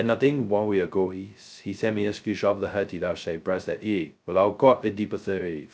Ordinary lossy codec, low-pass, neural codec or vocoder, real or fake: none; none; codec, 16 kHz, 0.2 kbps, FocalCodec; fake